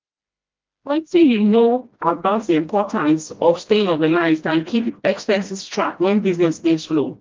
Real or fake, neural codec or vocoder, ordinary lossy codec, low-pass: fake; codec, 16 kHz, 1 kbps, FreqCodec, smaller model; Opus, 24 kbps; 7.2 kHz